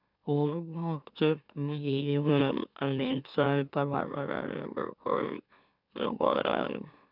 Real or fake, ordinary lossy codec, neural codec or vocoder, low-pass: fake; none; autoencoder, 44.1 kHz, a latent of 192 numbers a frame, MeloTTS; 5.4 kHz